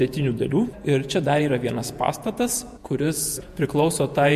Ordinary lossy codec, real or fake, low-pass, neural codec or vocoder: MP3, 64 kbps; fake; 14.4 kHz; vocoder, 48 kHz, 128 mel bands, Vocos